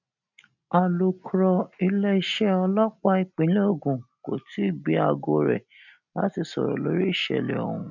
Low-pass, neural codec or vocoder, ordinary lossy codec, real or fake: 7.2 kHz; none; none; real